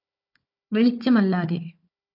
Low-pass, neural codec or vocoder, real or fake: 5.4 kHz; codec, 16 kHz, 4 kbps, FunCodec, trained on Chinese and English, 50 frames a second; fake